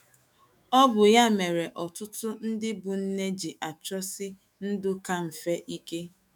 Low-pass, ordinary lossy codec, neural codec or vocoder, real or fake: 19.8 kHz; none; autoencoder, 48 kHz, 128 numbers a frame, DAC-VAE, trained on Japanese speech; fake